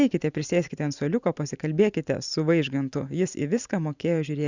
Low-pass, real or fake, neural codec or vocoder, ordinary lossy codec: 7.2 kHz; fake; vocoder, 44.1 kHz, 128 mel bands every 512 samples, BigVGAN v2; Opus, 64 kbps